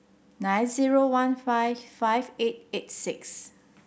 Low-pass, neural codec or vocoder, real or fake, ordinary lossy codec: none; none; real; none